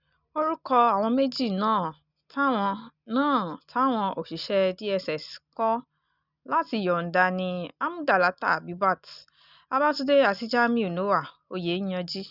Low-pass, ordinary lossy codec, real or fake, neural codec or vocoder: 5.4 kHz; none; real; none